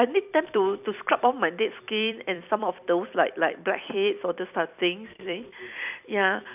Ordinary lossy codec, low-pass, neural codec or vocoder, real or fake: none; 3.6 kHz; vocoder, 44.1 kHz, 128 mel bands every 256 samples, BigVGAN v2; fake